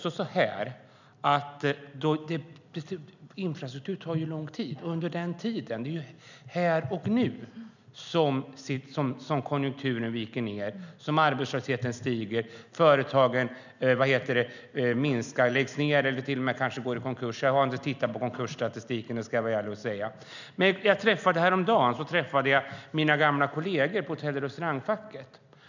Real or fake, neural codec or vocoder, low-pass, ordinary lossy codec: real; none; 7.2 kHz; none